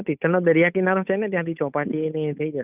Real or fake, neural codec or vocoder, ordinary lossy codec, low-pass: fake; codec, 16 kHz, 8 kbps, FunCodec, trained on Chinese and English, 25 frames a second; none; 3.6 kHz